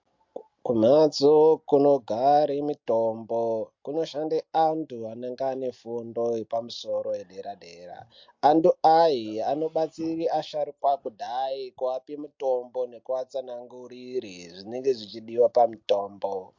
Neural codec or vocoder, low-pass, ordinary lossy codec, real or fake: none; 7.2 kHz; MP3, 48 kbps; real